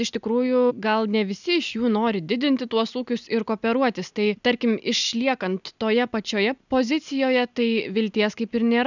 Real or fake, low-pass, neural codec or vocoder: real; 7.2 kHz; none